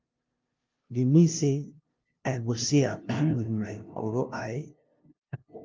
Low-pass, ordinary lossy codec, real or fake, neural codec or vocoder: 7.2 kHz; Opus, 32 kbps; fake; codec, 16 kHz, 0.5 kbps, FunCodec, trained on LibriTTS, 25 frames a second